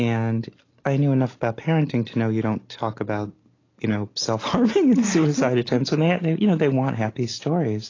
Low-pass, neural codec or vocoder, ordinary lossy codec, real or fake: 7.2 kHz; none; AAC, 32 kbps; real